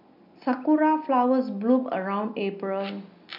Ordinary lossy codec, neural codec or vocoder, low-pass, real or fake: none; none; 5.4 kHz; real